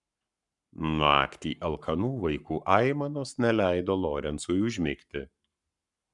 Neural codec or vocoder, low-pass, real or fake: codec, 44.1 kHz, 7.8 kbps, Pupu-Codec; 10.8 kHz; fake